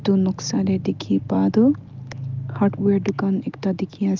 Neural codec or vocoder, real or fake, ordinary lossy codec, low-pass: none; real; Opus, 32 kbps; 7.2 kHz